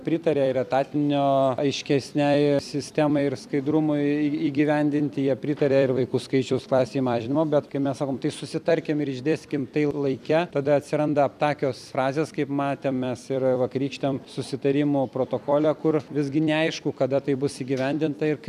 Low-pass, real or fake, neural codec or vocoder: 14.4 kHz; fake; vocoder, 44.1 kHz, 128 mel bands every 256 samples, BigVGAN v2